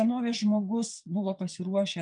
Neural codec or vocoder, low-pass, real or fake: vocoder, 22.05 kHz, 80 mel bands, WaveNeXt; 9.9 kHz; fake